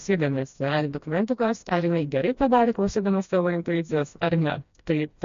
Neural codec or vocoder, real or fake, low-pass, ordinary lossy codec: codec, 16 kHz, 1 kbps, FreqCodec, smaller model; fake; 7.2 kHz; MP3, 48 kbps